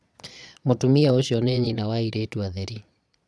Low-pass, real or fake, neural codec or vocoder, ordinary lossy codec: none; fake; vocoder, 22.05 kHz, 80 mel bands, Vocos; none